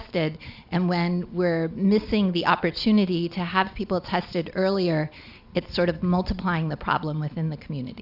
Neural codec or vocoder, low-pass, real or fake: none; 5.4 kHz; real